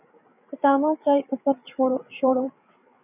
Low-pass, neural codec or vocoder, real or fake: 3.6 kHz; codec, 16 kHz, 8 kbps, FreqCodec, larger model; fake